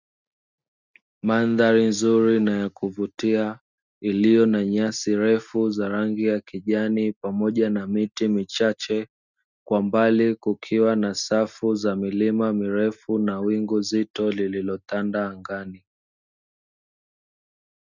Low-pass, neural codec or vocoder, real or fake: 7.2 kHz; none; real